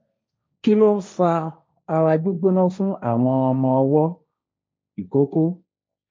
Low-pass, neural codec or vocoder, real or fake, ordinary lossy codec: none; codec, 16 kHz, 1.1 kbps, Voila-Tokenizer; fake; none